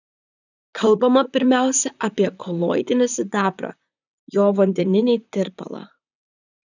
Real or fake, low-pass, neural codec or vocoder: fake; 7.2 kHz; vocoder, 44.1 kHz, 128 mel bands, Pupu-Vocoder